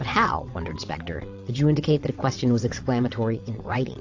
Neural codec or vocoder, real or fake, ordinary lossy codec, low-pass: vocoder, 22.05 kHz, 80 mel bands, WaveNeXt; fake; AAC, 48 kbps; 7.2 kHz